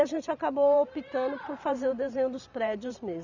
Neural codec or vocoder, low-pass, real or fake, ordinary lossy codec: vocoder, 44.1 kHz, 128 mel bands every 512 samples, BigVGAN v2; 7.2 kHz; fake; none